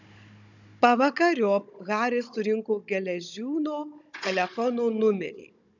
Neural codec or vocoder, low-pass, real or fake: codec, 16 kHz, 16 kbps, FunCodec, trained on Chinese and English, 50 frames a second; 7.2 kHz; fake